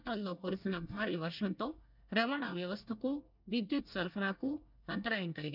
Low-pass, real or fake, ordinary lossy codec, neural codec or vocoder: 5.4 kHz; fake; none; codec, 24 kHz, 1 kbps, SNAC